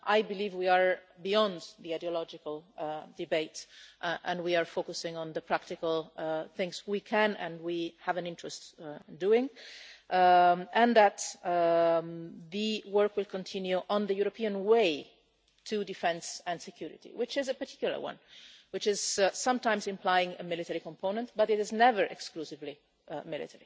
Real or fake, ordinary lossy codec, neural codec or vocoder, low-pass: real; none; none; none